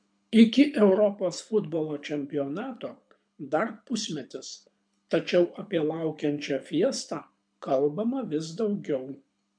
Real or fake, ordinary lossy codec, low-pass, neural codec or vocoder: fake; MP3, 64 kbps; 9.9 kHz; codec, 24 kHz, 6 kbps, HILCodec